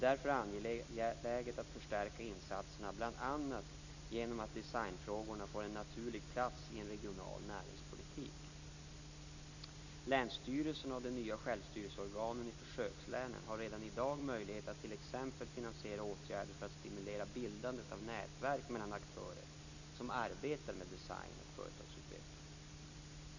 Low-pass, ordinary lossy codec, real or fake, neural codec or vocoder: 7.2 kHz; none; real; none